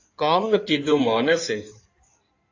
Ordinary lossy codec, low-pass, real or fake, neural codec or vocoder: AAC, 48 kbps; 7.2 kHz; fake; codec, 16 kHz in and 24 kHz out, 2.2 kbps, FireRedTTS-2 codec